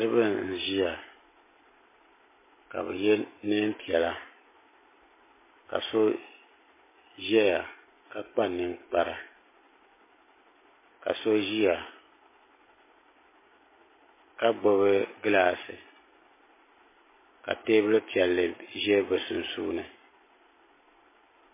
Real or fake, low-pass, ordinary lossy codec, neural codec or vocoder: real; 3.6 kHz; MP3, 16 kbps; none